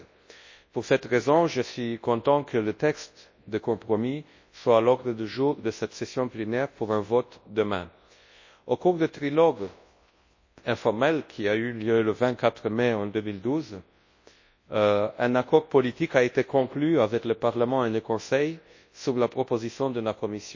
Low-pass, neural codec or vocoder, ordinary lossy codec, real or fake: 7.2 kHz; codec, 24 kHz, 0.9 kbps, WavTokenizer, large speech release; MP3, 32 kbps; fake